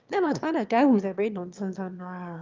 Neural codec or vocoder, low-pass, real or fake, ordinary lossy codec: autoencoder, 22.05 kHz, a latent of 192 numbers a frame, VITS, trained on one speaker; 7.2 kHz; fake; Opus, 24 kbps